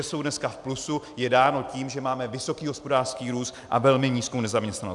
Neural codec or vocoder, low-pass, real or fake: none; 10.8 kHz; real